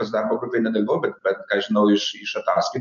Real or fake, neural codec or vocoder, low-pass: real; none; 7.2 kHz